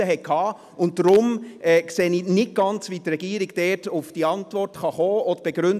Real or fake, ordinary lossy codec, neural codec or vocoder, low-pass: real; none; none; 14.4 kHz